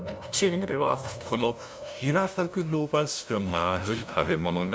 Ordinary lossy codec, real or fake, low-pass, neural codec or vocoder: none; fake; none; codec, 16 kHz, 0.5 kbps, FunCodec, trained on LibriTTS, 25 frames a second